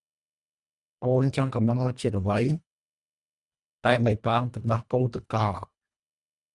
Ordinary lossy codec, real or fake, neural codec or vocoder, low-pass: Opus, 64 kbps; fake; codec, 24 kHz, 1.5 kbps, HILCodec; 10.8 kHz